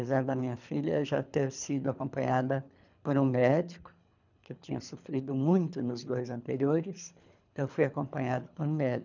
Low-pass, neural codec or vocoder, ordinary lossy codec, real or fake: 7.2 kHz; codec, 24 kHz, 3 kbps, HILCodec; none; fake